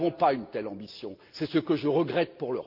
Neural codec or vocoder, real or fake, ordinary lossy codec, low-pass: none; real; Opus, 24 kbps; 5.4 kHz